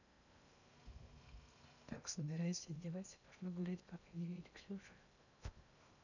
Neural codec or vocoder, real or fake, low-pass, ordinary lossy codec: codec, 16 kHz in and 24 kHz out, 0.6 kbps, FocalCodec, streaming, 2048 codes; fake; 7.2 kHz; none